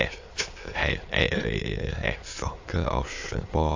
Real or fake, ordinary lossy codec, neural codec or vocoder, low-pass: fake; AAC, 48 kbps; autoencoder, 22.05 kHz, a latent of 192 numbers a frame, VITS, trained on many speakers; 7.2 kHz